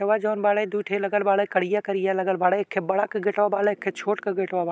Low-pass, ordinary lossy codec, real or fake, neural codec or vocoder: none; none; real; none